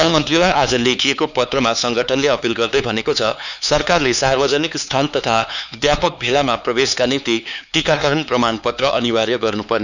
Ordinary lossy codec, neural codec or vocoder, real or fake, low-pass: none; codec, 16 kHz, 4 kbps, X-Codec, HuBERT features, trained on LibriSpeech; fake; 7.2 kHz